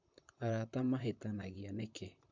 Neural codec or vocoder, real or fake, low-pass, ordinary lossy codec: vocoder, 44.1 kHz, 128 mel bands, Pupu-Vocoder; fake; 7.2 kHz; MP3, 48 kbps